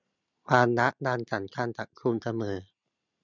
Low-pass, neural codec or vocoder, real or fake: 7.2 kHz; none; real